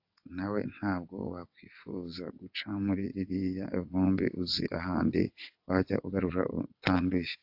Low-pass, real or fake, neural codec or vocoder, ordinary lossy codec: 5.4 kHz; fake; vocoder, 22.05 kHz, 80 mel bands, WaveNeXt; AAC, 48 kbps